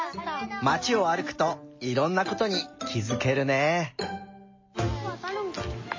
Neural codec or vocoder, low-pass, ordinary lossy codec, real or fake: none; 7.2 kHz; MP3, 32 kbps; real